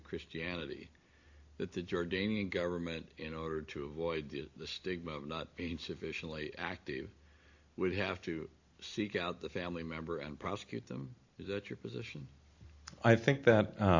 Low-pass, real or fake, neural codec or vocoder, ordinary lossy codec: 7.2 kHz; real; none; AAC, 48 kbps